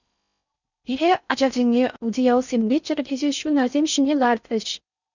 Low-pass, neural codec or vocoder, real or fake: 7.2 kHz; codec, 16 kHz in and 24 kHz out, 0.6 kbps, FocalCodec, streaming, 4096 codes; fake